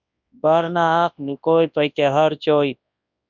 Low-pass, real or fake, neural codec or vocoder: 7.2 kHz; fake; codec, 24 kHz, 0.9 kbps, WavTokenizer, large speech release